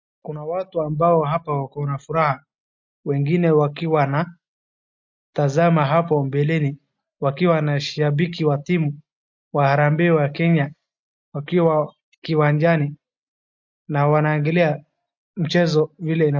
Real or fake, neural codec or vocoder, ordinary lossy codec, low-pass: real; none; MP3, 48 kbps; 7.2 kHz